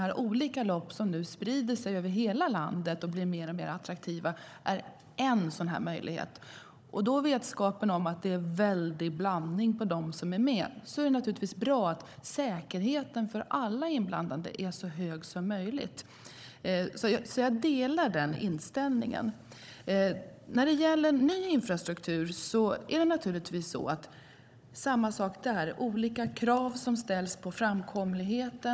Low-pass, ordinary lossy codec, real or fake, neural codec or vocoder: none; none; fake; codec, 16 kHz, 16 kbps, FunCodec, trained on Chinese and English, 50 frames a second